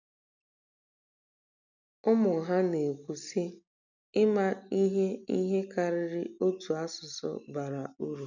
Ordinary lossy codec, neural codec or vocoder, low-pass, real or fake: none; none; 7.2 kHz; real